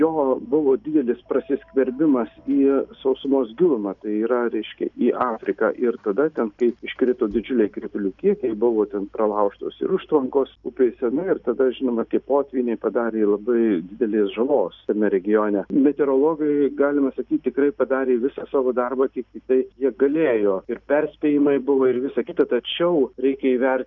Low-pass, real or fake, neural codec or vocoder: 7.2 kHz; real; none